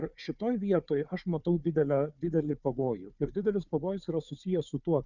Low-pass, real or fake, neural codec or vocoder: 7.2 kHz; fake; codec, 16 kHz, 4 kbps, FunCodec, trained on Chinese and English, 50 frames a second